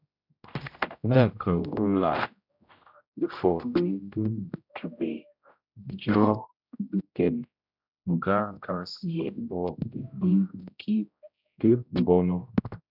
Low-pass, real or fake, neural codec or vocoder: 5.4 kHz; fake; codec, 16 kHz, 0.5 kbps, X-Codec, HuBERT features, trained on general audio